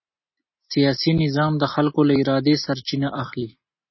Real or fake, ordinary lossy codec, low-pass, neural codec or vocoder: real; MP3, 24 kbps; 7.2 kHz; none